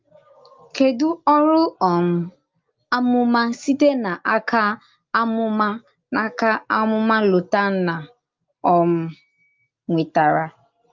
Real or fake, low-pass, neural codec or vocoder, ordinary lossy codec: real; 7.2 kHz; none; Opus, 24 kbps